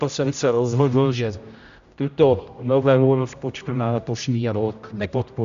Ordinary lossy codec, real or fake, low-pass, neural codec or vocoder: Opus, 64 kbps; fake; 7.2 kHz; codec, 16 kHz, 0.5 kbps, X-Codec, HuBERT features, trained on general audio